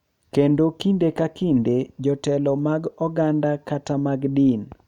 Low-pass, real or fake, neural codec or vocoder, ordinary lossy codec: 19.8 kHz; real; none; none